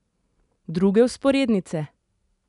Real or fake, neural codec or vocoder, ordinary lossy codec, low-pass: real; none; none; 10.8 kHz